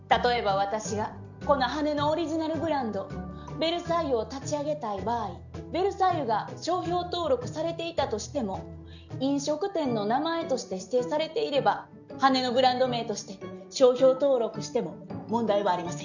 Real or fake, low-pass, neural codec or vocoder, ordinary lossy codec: real; 7.2 kHz; none; none